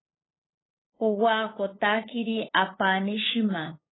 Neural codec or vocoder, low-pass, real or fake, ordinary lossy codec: codec, 16 kHz, 8 kbps, FunCodec, trained on LibriTTS, 25 frames a second; 7.2 kHz; fake; AAC, 16 kbps